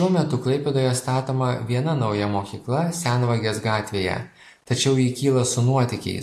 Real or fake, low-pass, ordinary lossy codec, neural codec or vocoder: real; 14.4 kHz; AAC, 64 kbps; none